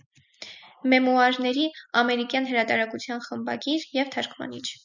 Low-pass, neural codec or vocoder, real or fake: 7.2 kHz; none; real